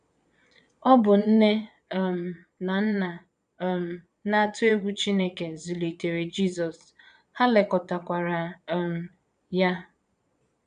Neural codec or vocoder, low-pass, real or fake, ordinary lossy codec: vocoder, 22.05 kHz, 80 mel bands, Vocos; 9.9 kHz; fake; none